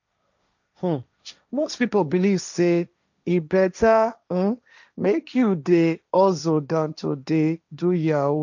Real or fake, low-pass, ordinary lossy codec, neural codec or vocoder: fake; 7.2 kHz; none; codec, 16 kHz, 1.1 kbps, Voila-Tokenizer